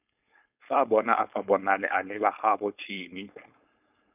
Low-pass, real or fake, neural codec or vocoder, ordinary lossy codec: 3.6 kHz; fake; codec, 16 kHz, 4.8 kbps, FACodec; none